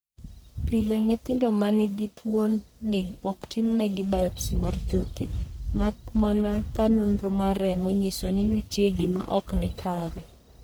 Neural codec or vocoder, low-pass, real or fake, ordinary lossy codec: codec, 44.1 kHz, 1.7 kbps, Pupu-Codec; none; fake; none